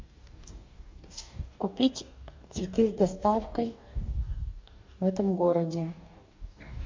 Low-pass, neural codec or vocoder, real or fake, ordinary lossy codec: 7.2 kHz; codec, 44.1 kHz, 2.6 kbps, DAC; fake; MP3, 64 kbps